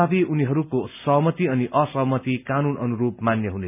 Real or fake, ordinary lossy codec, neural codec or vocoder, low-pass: real; none; none; 3.6 kHz